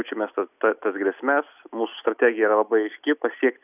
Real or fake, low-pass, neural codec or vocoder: real; 3.6 kHz; none